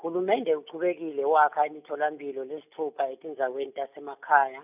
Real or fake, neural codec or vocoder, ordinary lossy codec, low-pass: real; none; none; 3.6 kHz